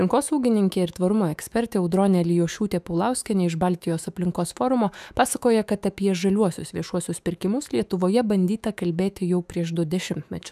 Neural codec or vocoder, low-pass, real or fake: autoencoder, 48 kHz, 128 numbers a frame, DAC-VAE, trained on Japanese speech; 14.4 kHz; fake